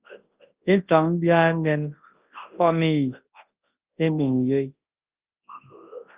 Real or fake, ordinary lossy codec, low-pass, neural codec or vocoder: fake; Opus, 24 kbps; 3.6 kHz; codec, 24 kHz, 0.9 kbps, WavTokenizer, large speech release